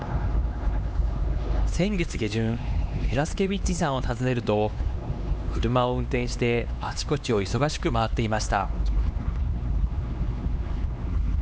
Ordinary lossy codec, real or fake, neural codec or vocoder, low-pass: none; fake; codec, 16 kHz, 2 kbps, X-Codec, HuBERT features, trained on LibriSpeech; none